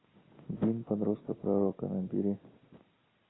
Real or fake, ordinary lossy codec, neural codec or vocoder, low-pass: real; AAC, 16 kbps; none; 7.2 kHz